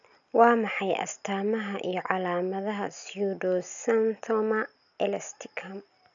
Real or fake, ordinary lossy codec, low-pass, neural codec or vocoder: real; none; 7.2 kHz; none